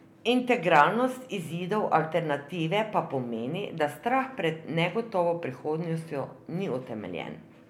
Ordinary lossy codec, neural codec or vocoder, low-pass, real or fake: MP3, 96 kbps; none; 19.8 kHz; real